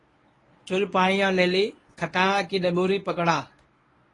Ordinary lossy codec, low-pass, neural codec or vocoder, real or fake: AAC, 32 kbps; 10.8 kHz; codec, 24 kHz, 0.9 kbps, WavTokenizer, medium speech release version 1; fake